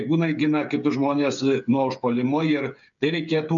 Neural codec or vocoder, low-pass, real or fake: codec, 16 kHz, 8 kbps, FreqCodec, smaller model; 7.2 kHz; fake